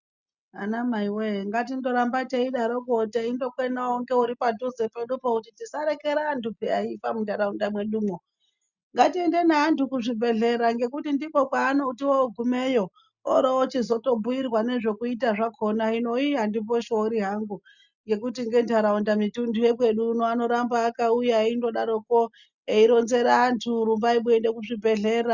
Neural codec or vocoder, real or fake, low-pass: none; real; 7.2 kHz